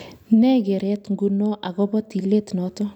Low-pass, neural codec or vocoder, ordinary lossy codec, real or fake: 19.8 kHz; none; none; real